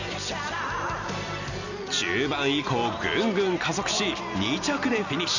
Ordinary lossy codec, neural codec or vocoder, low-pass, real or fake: none; none; 7.2 kHz; real